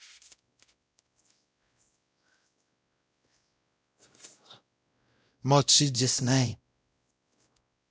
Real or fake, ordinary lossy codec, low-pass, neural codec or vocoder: fake; none; none; codec, 16 kHz, 0.5 kbps, X-Codec, WavLM features, trained on Multilingual LibriSpeech